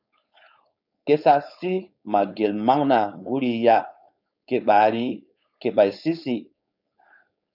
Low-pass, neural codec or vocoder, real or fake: 5.4 kHz; codec, 16 kHz, 4.8 kbps, FACodec; fake